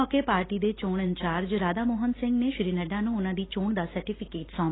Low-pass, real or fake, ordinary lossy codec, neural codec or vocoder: 7.2 kHz; real; AAC, 16 kbps; none